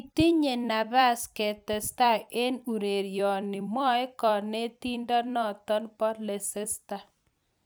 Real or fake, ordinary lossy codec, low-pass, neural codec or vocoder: fake; none; none; vocoder, 44.1 kHz, 128 mel bands every 256 samples, BigVGAN v2